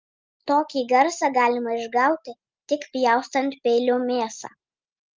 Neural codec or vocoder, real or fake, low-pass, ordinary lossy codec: none; real; 7.2 kHz; Opus, 24 kbps